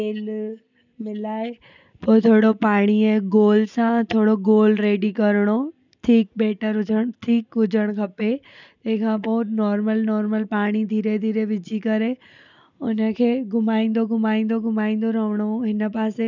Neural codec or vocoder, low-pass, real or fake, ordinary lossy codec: none; 7.2 kHz; real; none